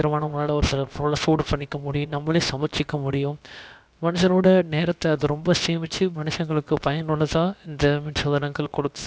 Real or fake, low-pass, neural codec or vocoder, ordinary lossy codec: fake; none; codec, 16 kHz, about 1 kbps, DyCAST, with the encoder's durations; none